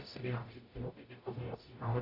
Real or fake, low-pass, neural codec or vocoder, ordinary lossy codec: fake; 5.4 kHz; codec, 44.1 kHz, 0.9 kbps, DAC; AAC, 32 kbps